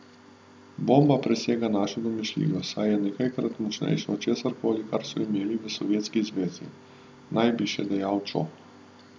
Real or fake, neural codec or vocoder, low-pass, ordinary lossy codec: real; none; none; none